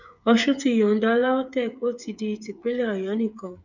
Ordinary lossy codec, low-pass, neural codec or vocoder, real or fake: none; 7.2 kHz; codec, 16 kHz, 8 kbps, FreqCodec, smaller model; fake